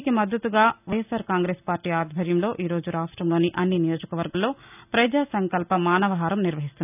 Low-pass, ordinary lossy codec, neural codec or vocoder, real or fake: 3.6 kHz; none; none; real